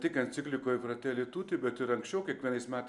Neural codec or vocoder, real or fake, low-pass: none; real; 10.8 kHz